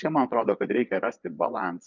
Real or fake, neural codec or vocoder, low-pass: fake; vocoder, 22.05 kHz, 80 mel bands, Vocos; 7.2 kHz